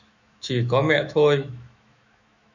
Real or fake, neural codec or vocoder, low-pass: fake; autoencoder, 48 kHz, 128 numbers a frame, DAC-VAE, trained on Japanese speech; 7.2 kHz